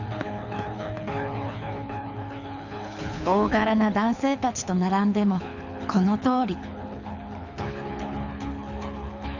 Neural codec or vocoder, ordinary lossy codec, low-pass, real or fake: codec, 24 kHz, 6 kbps, HILCodec; none; 7.2 kHz; fake